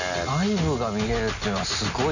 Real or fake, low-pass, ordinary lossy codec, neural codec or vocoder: real; 7.2 kHz; none; none